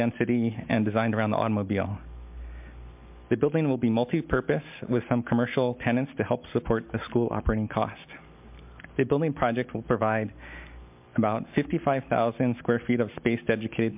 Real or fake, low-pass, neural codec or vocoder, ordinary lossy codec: real; 3.6 kHz; none; MP3, 32 kbps